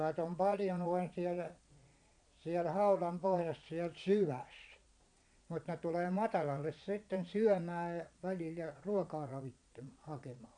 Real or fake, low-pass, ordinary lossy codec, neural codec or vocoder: fake; 9.9 kHz; AAC, 64 kbps; vocoder, 22.05 kHz, 80 mel bands, Vocos